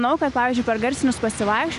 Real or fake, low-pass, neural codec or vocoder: real; 10.8 kHz; none